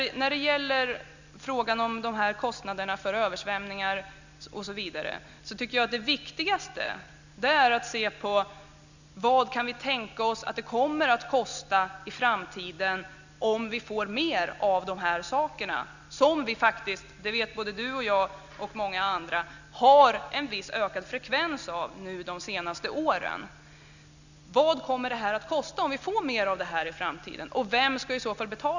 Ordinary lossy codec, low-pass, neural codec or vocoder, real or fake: none; 7.2 kHz; none; real